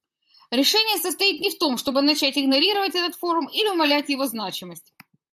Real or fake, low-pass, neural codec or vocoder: fake; 14.4 kHz; vocoder, 44.1 kHz, 128 mel bands, Pupu-Vocoder